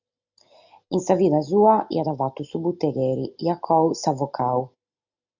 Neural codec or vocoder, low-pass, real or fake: none; 7.2 kHz; real